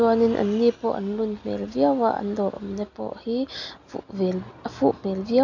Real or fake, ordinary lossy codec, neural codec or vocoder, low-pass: real; none; none; 7.2 kHz